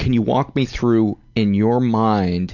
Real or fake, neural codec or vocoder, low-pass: real; none; 7.2 kHz